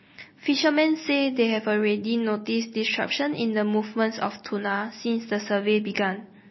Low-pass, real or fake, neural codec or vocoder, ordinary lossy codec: 7.2 kHz; real; none; MP3, 24 kbps